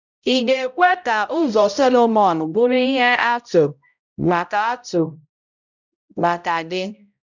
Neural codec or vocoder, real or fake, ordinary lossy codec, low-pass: codec, 16 kHz, 0.5 kbps, X-Codec, HuBERT features, trained on balanced general audio; fake; none; 7.2 kHz